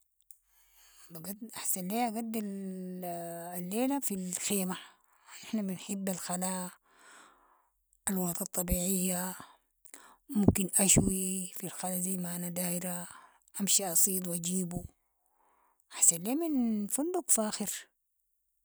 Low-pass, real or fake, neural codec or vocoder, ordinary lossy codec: none; real; none; none